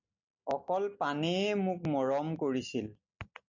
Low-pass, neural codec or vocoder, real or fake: 7.2 kHz; none; real